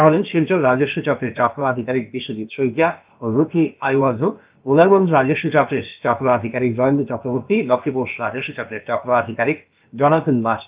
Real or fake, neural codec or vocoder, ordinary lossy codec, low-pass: fake; codec, 16 kHz, about 1 kbps, DyCAST, with the encoder's durations; Opus, 24 kbps; 3.6 kHz